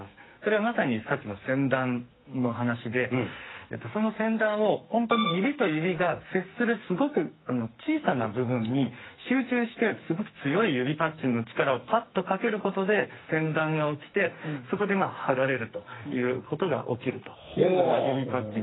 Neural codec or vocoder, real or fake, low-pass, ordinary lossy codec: codec, 44.1 kHz, 2.6 kbps, SNAC; fake; 7.2 kHz; AAC, 16 kbps